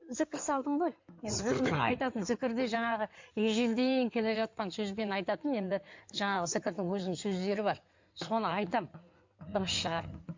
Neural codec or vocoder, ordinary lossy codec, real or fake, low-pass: codec, 16 kHz in and 24 kHz out, 2.2 kbps, FireRedTTS-2 codec; MP3, 48 kbps; fake; 7.2 kHz